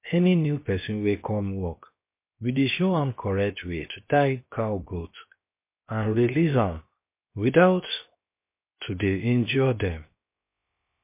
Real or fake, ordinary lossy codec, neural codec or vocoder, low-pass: fake; MP3, 24 kbps; codec, 16 kHz, 0.7 kbps, FocalCodec; 3.6 kHz